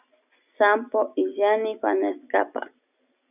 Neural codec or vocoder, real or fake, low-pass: none; real; 3.6 kHz